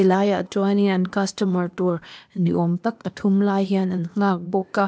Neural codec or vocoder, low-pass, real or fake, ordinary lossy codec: codec, 16 kHz, 0.8 kbps, ZipCodec; none; fake; none